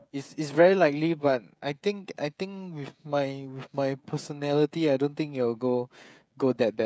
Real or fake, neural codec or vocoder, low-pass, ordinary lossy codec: fake; codec, 16 kHz, 16 kbps, FreqCodec, smaller model; none; none